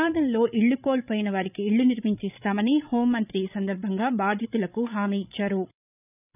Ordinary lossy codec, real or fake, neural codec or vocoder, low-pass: none; fake; codec, 16 kHz, 16 kbps, FreqCodec, larger model; 3.6 kHz